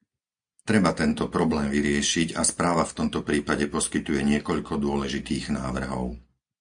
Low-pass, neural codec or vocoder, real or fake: 9.9 kHz; none; real